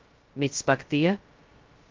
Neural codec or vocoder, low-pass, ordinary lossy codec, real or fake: codec, 16 kHz, 0.2 kbps, FocalCodec; 7.2 kHz; Opus, 32 kbps; fake